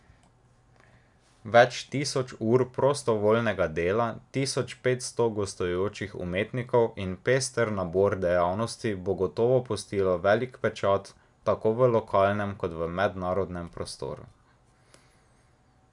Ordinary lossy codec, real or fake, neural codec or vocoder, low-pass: none; real; none; 10.8 kHz